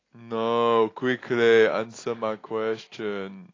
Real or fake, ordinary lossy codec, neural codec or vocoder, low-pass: real; AAC, 32 kbps; none; 7.2 kHz